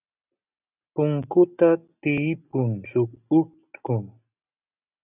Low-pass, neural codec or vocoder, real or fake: 3.6 kHz; none; real